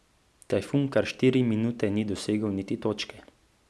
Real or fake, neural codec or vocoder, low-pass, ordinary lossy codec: real; none; none; none